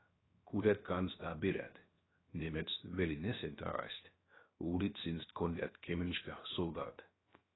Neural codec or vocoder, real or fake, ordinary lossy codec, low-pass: codec, 16 kHz, 0.7 kbps, FocalCodec; fake; AAC, 16 kbps; 7.2 kHz